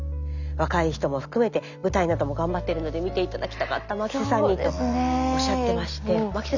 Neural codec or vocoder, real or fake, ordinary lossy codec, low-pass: none; real; none; 7.2 kHz